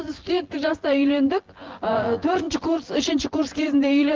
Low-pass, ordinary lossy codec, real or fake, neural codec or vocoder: 7.2 kHz; Opus, 16 kbps; fake; vocoder, 24 kHz, 100 mel bands, Vocos